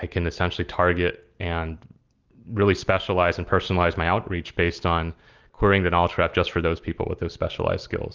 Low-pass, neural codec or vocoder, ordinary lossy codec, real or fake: 7.2 kHz; none; Opus, 24 kbps; real